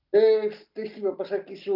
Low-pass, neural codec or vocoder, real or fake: 5.4 kHz; none; real